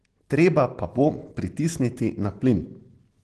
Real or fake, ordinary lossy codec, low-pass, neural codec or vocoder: real; Opus, 16 kbps; 10.8 kHz; none